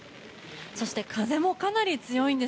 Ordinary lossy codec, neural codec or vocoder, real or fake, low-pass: none; none; real; none